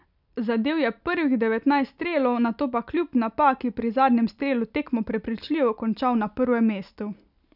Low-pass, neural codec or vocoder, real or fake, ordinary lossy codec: 5.4 kHz; none; real; none